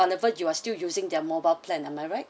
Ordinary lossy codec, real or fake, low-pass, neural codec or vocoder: none; real; none; none